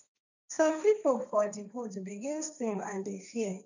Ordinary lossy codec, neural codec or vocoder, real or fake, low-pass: none; codec, 16 kHz, 1.1 kbps, Voila-Tokenizer; fake; 7.2 kHz